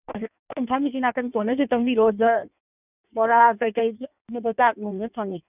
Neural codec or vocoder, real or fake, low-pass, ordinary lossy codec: codec, 16 kHz in and 24 kHz out, 1.1 kbps, FireRedTTS-2 codec; fake; 3.6 kHz; none